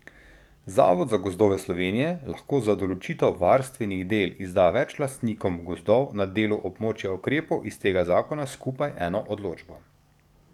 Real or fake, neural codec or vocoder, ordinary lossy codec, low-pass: fake; codec, 44.1 kHz, 7.8 kbps, DAC; none; 19.8 kHz